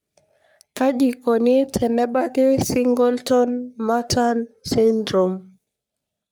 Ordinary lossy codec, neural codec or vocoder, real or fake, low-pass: none; codec, 44.1 kHz, 3.4 kbps, Pupu-Codec; fake; none